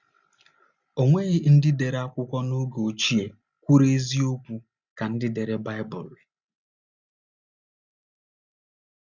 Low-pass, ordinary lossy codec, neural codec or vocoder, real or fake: 7.2 kHz; Opus, 64 kbps; none; real